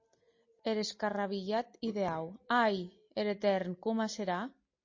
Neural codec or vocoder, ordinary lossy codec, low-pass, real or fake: none; MP3, 32 kbps; 7.2 kHz; real